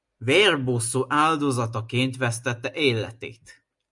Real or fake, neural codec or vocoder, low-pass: real; none; 10.8 kHz